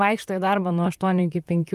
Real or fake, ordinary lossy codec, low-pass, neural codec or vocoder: fake; Opus, 32 kbps; 14.4 kHz; vocoder, 44.1 kHz, 128 mel bands, Pupu-Vocoder